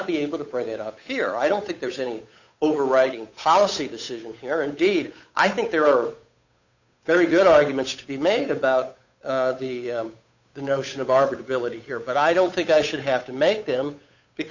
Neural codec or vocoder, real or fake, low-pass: codec, 16 kHz, 8 kbps, FunCodec, trained on Chinese and English, 25 frames a second; fake; 7.2 kHz